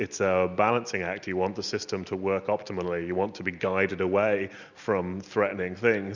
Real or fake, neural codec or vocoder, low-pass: real; none; 7.2 kHz